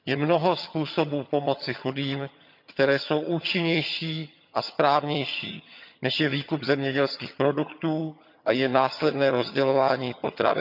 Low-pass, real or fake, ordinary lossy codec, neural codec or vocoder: 5.4 kHz; fake; none; vocoder, 22.05 kHz, 80 mel bands, HiFi-GAN